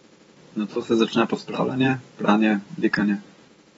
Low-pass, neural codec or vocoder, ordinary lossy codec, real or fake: 19.8 kHz; vocoder, 44.1 kHz, 128 mel bands every 512 samples, BigVGAN v2; AAC, 24 kbps; fake